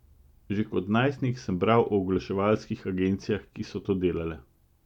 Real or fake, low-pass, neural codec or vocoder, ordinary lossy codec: real; 19.8 kHz; none; none